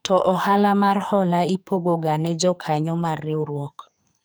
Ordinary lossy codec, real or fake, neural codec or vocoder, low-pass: none; fake; codec, 44.1 kHz, 2.6 kbps, SNAC; none